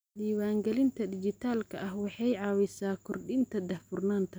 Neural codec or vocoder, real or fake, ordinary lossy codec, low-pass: none; real; none; none